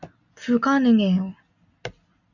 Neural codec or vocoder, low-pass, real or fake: none; 7.2 kHz; real